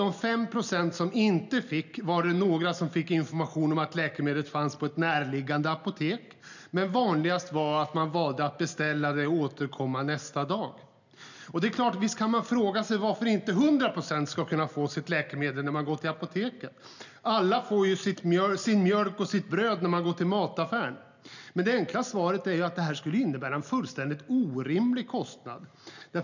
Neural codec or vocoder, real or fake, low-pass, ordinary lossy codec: none; real; 7.2 kHz; none